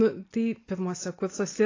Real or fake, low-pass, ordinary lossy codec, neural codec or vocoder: real; 7.2 kHz; AAC, 32 kbps; none